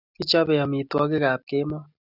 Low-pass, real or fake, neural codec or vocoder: 5.4 kHz; real; none